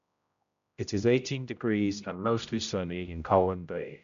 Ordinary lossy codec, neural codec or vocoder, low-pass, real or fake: none; codec, 16 kHz, 0.5 kbps, X-Codec, HuBERT features, trained on general audio; 7.2 kHz; fake